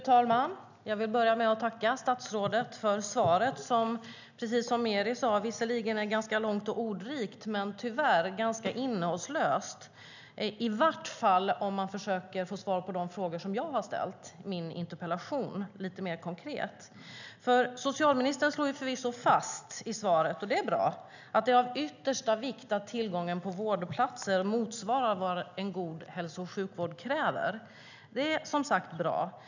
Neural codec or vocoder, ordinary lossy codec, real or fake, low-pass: none; none; real; 7.2 kHz